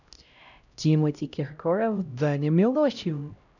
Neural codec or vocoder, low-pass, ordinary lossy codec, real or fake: codec, 16 kHz, 0.5 kbps, X-Codec, HuBERT features, trained on LibriSpeech; 7.2 kHz; none; fake